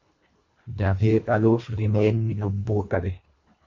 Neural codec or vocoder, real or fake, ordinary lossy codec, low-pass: codec, 24 kHz, 1.5 kbps, HILCodec; fake; MP3, 48 kbps; 7.2 kHz